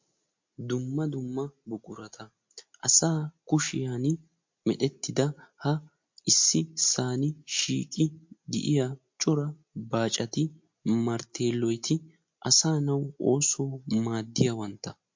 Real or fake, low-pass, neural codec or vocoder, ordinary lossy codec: real; 7.2 kHz; none; MP3, 48 kbps